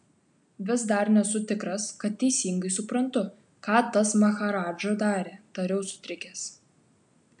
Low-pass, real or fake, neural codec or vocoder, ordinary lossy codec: 9.9 kHz; real; none; MP3, 96 kbps